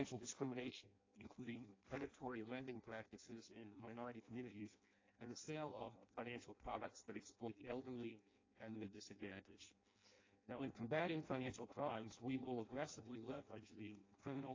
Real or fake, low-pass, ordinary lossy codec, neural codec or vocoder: fake; 7.2 kHz; AAC, 32 kbps; codec, 16 kHz in and 24 kHz out, 0.6 kbps, FireRedTTS-2 codec